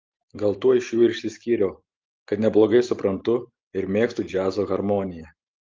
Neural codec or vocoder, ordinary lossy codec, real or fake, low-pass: none; Opus, 32 kbps; real; 7.2 kHz